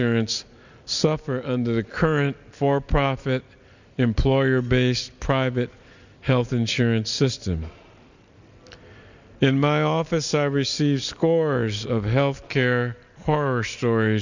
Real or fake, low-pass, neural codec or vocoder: real; 7.2 kHz; none